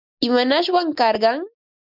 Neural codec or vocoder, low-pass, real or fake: none; 5.4 kHz; real